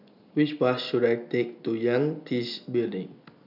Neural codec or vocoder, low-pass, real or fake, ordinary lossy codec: none; 5.4 kHz; real; none